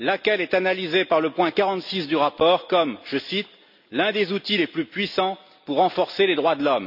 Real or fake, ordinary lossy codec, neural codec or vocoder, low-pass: real; none; none; 5.4 kHz